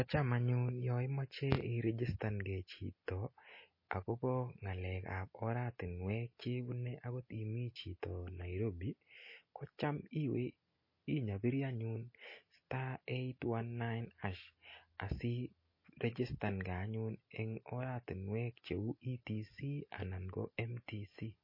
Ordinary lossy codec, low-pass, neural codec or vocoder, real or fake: MP3, 24 kbps; 5.4 kHz; none; real